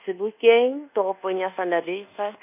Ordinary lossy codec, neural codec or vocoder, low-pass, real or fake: none; codec, 24 kHz, 1.2 kbps, DualCodec; 3.6 kHz; fake